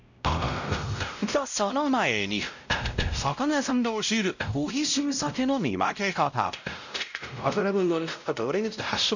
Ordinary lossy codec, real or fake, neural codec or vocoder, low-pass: none; fake; codec, 16 kHz, 0.5 kbps, X-Codec, WavLM features, trained on Multilingual LibriSpeech; 7.2 kHz